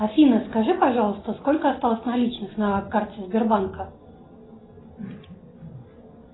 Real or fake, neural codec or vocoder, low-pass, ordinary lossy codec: real; none; 7.2 kHz; AAC, 16 kbps